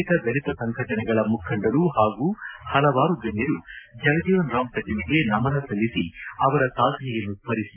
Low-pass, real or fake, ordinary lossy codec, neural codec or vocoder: 3.6 kHz; real; AAC, 32 kbps; none